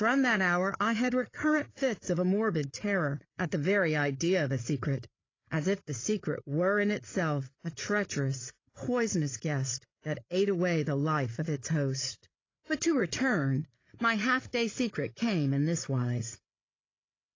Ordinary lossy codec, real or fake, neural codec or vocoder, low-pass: AAC, 32 kbps; fake; codec, 16 kHz, 8 kbps, FreqCodec, larger model; 7.2 kHz